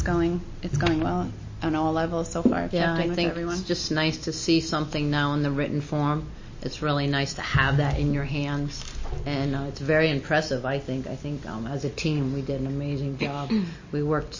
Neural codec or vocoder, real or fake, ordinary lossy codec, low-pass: none; real; MP3, 32 kbps; 7.2 kHz